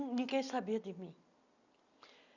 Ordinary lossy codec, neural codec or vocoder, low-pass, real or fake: none; none; 7.2 kHz; real